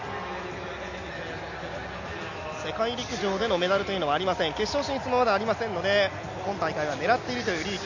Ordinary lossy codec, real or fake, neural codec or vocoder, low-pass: none; real; none; 7.2 kHz